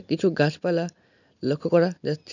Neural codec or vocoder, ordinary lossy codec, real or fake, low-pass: none; none; real; 7.2 kHz